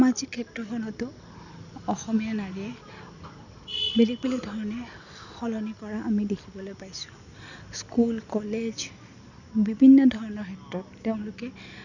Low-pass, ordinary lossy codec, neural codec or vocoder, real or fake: 7.2 kHz; none; none; real